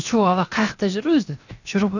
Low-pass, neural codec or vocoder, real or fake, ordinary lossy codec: 7.2 kHz; codec, 16 kHz, 0.7 kbps, FocalCodec; fake; none